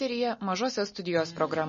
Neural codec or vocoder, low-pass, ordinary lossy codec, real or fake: none; 7.2 kHz; MP3, 32 kbps; real